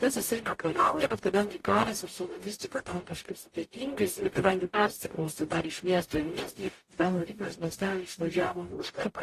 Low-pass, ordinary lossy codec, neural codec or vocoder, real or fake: 14.4 kHz; AAC, 48 kbps; codec, 44.1 kHz, 0.9 kbps, DAC; fake